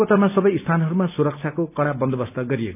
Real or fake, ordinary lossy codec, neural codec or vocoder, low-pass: real; none; none; 3.6 kHz